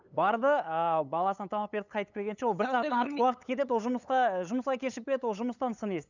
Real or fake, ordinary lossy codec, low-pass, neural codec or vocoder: fake; none; 7.2 kHz; codec, 16 kHz, 8 kbps, FunCodec, trained on LibriTTS, 25 frames a second